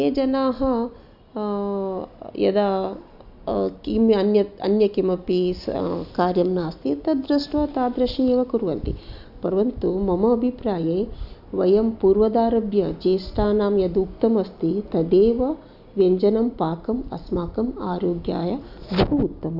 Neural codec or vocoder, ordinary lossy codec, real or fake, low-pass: none; none; real; 5.4 kHz